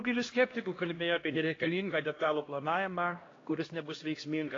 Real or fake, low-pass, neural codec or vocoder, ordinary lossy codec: fake; 7.2 kHz; codec, 16 kHz, 1 kbps, X-Codec, HuBERT features, trained on LibriSpeech; AAC, 32 kbps